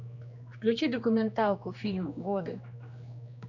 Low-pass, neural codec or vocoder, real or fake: 7.2 kHz; codec, 16 kHz, 2 kbps, X-Codec, HuBERT features, trained on general audio; fake